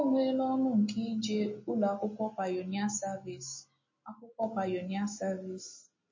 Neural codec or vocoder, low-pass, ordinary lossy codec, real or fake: none; 7.2 kHz; MP3, 32 kbps; real